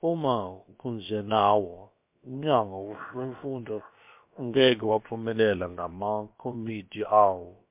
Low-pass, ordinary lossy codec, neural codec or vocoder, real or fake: 3.6 kHz; MP3, 32 kbps; codec, 16 kHz, about 1 kbps, DyCAST, with the encoder's durations; fake